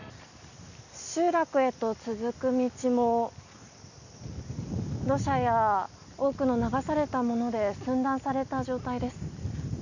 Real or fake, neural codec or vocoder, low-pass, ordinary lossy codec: real; none; 7.2 kHz; none